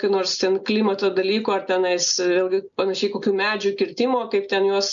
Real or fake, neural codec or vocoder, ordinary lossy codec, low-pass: real; none; MP3, 96 kbps; 7.2 kHz